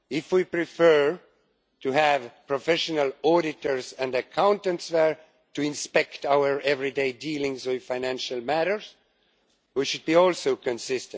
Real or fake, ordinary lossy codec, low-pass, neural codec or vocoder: real; none; none; none